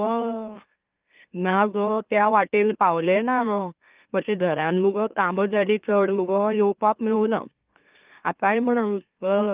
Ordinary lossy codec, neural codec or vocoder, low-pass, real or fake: Opus, 24 kbps; autoencoder, 44.1 kHz, a latent of 192 numbers a frame, MeloTTS; 3.6 kHz; fake